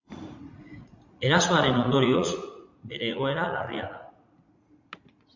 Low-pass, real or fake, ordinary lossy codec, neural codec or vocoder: 7.2 kHz; fake; MP3, 64 kbps; vocoder, 44.1 kHz, 80 mel bands, Vocos